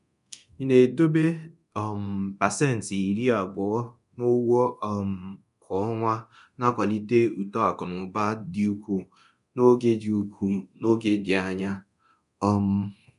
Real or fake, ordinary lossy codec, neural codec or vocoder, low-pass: fake; none; codec, 24 kHz, 0.9 kbps, DualCodec; 10.8 kHz